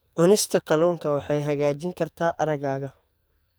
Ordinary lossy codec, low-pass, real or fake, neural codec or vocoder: none; none; fake; codec, 44.1 kHz, 2.6 kbps, SNAC